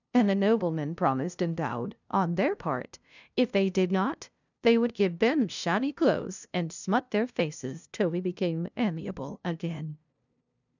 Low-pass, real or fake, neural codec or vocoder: 7.2 kHz; fake; codec, 16 kHz, 0.5 kbps, FunCodec, trained on LibriTTS, 25 frames a second